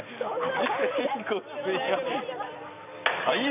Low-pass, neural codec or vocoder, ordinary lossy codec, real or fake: 3.6 kHz; none; none; real